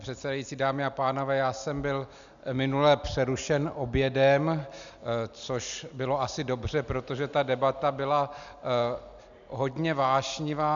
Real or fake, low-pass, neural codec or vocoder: real; 7.2 kHz; none